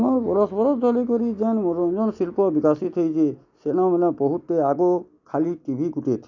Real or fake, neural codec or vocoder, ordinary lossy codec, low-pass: real; none; none; 7.2 kHz